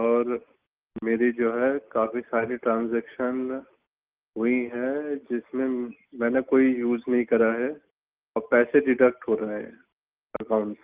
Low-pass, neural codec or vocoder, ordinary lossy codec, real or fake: 3.6 kHz; none; Opus, 32 kbps; real